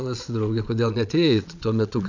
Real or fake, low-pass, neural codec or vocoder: fake; 7.2 kHz; vocoder, 22.05 kHz, 80 mel bands, Vocos